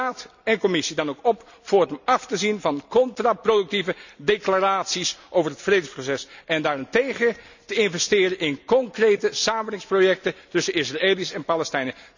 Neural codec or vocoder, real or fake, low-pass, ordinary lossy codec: none; real; 7.2 kHz; none